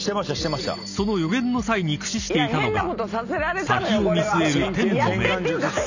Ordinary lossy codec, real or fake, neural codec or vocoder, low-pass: none; real; none; 7.2 kHz